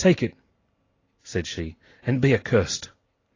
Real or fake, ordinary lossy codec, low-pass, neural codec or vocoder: real; AAC, 32 kbps; 7.2 kHz; none